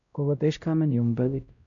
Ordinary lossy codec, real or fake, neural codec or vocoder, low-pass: none; fake; codec, 16 kHz, 0.5 kbps, X-Codec, WavLM features, trained on Multilingual LibriSpeech; 7.2 kHz